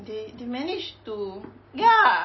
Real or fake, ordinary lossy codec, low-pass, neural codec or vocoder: real; MP3, 24 kbps; 7.2 kHz; none